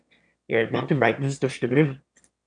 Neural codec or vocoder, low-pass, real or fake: autoencoder, 22.05 kHz, a latent of 192 numbers a frame, VITS, trained on one speaker; 9.9 kHz; fake